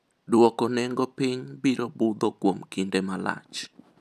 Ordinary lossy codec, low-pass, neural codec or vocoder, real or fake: none; 14.4 kHz; none; real